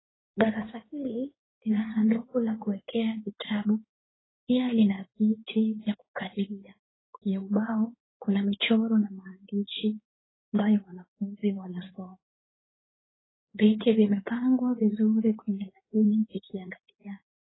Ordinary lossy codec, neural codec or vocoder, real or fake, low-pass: AAC, 16 kbps; codec, 16 kHz in and 24 kHz out, 2.2 kbps, FireRedTTS-2 codec; fake; 7.2 kHz